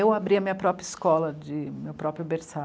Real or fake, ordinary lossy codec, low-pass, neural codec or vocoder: real; none; none; none